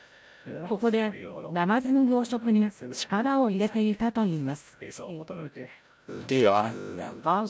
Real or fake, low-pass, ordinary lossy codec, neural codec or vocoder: fake; none; none; codec, 16 kHz, 0.5 kbps, FreqCodec, larger model